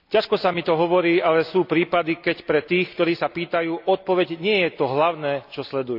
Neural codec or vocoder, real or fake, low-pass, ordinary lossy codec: none; real; 5.4 kHz; AAC, 48 kbps